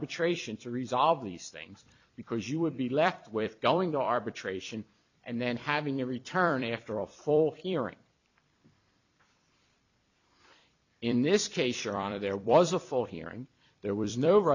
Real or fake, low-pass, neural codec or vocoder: fake; 7.2 kHz; vocoder, 44.1 kHz, 128 mel bands every 256 samples, BigVGAN v2